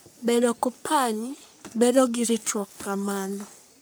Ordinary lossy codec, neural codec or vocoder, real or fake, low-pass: none; codec, 44.1 kHz, 3.4 kbps, Pupu-Codec; fake; none